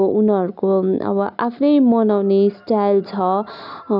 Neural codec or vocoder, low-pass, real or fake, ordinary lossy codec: none; 5.4 kHz; real; none